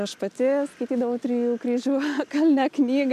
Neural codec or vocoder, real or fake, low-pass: none; real; 14.4 kHz